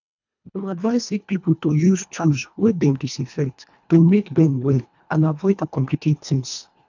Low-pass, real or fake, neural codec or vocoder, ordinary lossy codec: 7.2 kHz; fake; codec, 24 kHz, 1.5 kbps, HILCodec; none